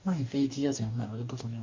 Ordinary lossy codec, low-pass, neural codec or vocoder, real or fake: MP3, 48 kbps; 7.2 kHz; codec, 44.1 kHz, 2.6 kbps, DAC; fake